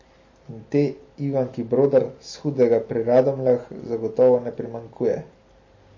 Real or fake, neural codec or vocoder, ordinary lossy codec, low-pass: real; none; MP3, 32 kbps; 7.2 kHz